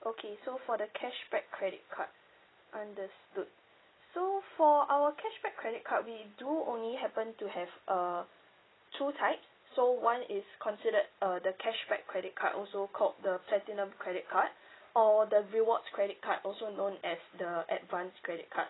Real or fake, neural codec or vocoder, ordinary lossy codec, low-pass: real; none; AAC, 16 kbps; 7.2 kHz